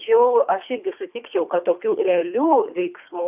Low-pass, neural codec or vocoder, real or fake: 3.6 kHz; codec, 24 kHz, 3 kbps, HILCodec; fake